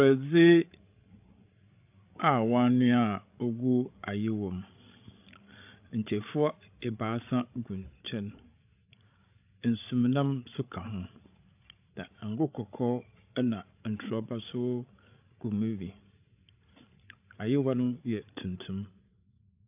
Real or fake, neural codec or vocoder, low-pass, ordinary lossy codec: fake; codec, 16 kHz, 16 kbps, FreqCodec, larger model; 3.6 kHz; AAC, 32 kbps